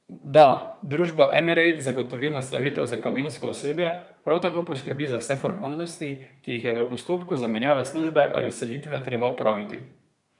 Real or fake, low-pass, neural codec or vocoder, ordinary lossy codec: fake; 10.8 kHz; codec, 24 kHz, 1 kbps, SNAC; none